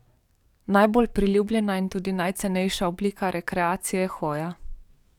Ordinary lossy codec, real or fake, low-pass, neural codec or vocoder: none; fake; 19.8 kHz; codec, 44.1 kHz, 7.8 kbps, DAC